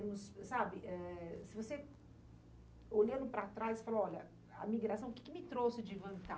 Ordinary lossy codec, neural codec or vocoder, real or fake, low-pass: none; none; real; none